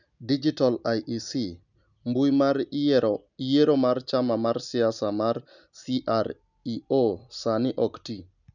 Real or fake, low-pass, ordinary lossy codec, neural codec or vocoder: real; 7.2 kHz; none; none